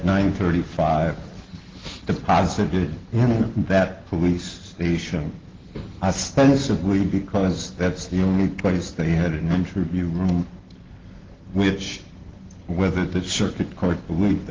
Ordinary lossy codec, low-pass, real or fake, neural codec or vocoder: Opus, 16 kbps; 7.2 kHz; real; none